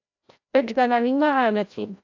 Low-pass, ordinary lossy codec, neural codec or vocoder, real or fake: 7.2 kHz; AAC, 48 kbps; codec, 16 kHz, 0.5 kbps, FreqCodec, larger model; fake